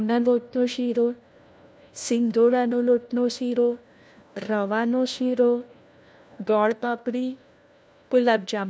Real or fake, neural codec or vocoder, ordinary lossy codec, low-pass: fake; codec, 16 kHz, 1 kbps, FunCodec, trained on LibriTTS, 50 frames a second; none; none